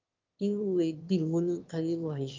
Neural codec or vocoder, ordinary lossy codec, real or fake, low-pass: autoencoder, 22.05 kHz, a latent of 192 numbers a frame, VITS, trained on one speaker; Opus, 32 kbps; fake; 7.2 kHz